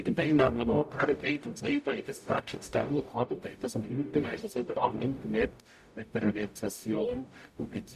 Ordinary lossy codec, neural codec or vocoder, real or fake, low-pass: none; codec, 44.1 kHz, 0.9 kbps, DAC; fake; 14.4 kHz